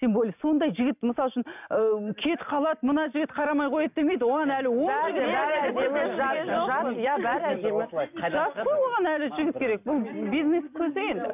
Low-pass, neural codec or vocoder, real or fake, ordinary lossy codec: 3.6 kHz; none; real; none